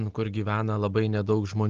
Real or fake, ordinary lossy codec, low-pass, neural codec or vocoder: real; Opus, 16 kbps; 7.2 kHz; none